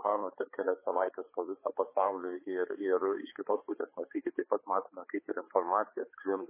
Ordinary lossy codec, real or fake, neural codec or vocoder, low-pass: MP3, 16 kbps; fake; codec, 16 kHz, 4 kbps, X-Codec, HuBERT features, trained on balanced general audio; 3.6 kHz